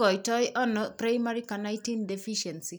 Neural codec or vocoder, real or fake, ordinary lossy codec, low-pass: none; real; none; none